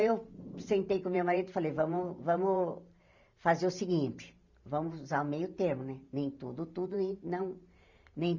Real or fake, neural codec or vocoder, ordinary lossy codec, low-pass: fake; vocoder, 44.1 kHz, 128 mel bands every 512 samples, BigVGAN v2; none; 7.2 kHz